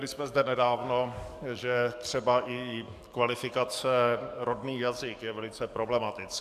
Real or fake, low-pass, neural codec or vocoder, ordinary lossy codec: fake; 14.4 kHz; codec, 44.1 kHz, 7.8 kbps, DAC; MP3, 96 kbps